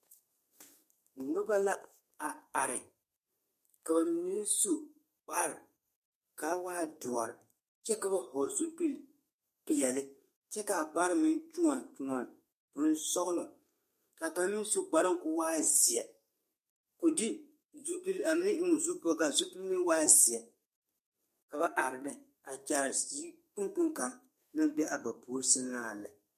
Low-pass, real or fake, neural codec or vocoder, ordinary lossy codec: 14.4 kHz; fake; codec, 32 kHz, 1.9 kbps, SNAC; MP3, 64 kbps